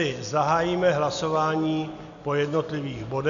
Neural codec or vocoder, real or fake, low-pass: none; real; 7.2 kHz